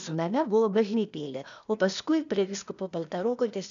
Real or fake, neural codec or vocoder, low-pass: fake; codec, 16 kHz, 0.8 kbps, ZipCodec; 7.2 kHz